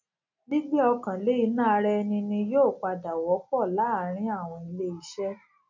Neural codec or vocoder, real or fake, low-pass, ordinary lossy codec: none; real; 7.2 kHz; none